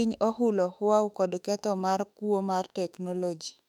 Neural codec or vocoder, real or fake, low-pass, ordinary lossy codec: autoencoder, 48 kHz, 32 numbers a frame, DAC-VAE, trained on Japanese speech; fake; 19.8 kHz; none